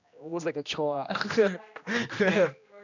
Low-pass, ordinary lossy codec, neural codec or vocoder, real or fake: 7.2 kHz; none; codec, 16 kHz, 1 kbps, X-Codec, HuBERT features, trained on general audio; fake